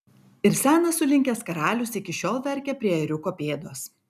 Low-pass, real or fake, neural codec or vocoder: 14.4 kHz; real; none